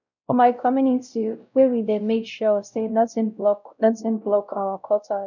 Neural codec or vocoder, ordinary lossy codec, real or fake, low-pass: codec, 16 kHz, 0.5 kbps, X-Codec, WavLM features, trained on Multilingual LibriSpeech; none; fake; 7.2 kHz